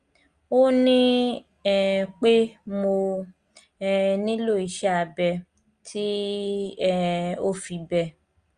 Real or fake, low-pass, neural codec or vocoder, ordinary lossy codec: real; 9.9 kHz; none; Opus, 32 kbps